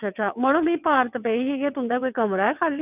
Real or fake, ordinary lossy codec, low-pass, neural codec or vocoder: fake; none; 3.6 kHz; vocoder, 22.05 kHz, 80 mel bands, WaveNeXt